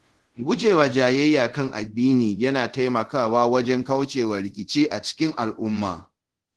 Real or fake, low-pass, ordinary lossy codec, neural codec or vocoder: fake; 10.8 kHz; Opus, 16 kbps; codec, 24 kHz, 0.5 kbps, DualCodec